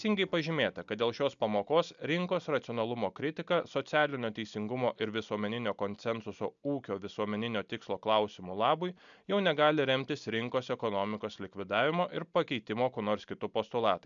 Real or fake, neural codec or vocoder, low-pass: real; none; 7.2 kHz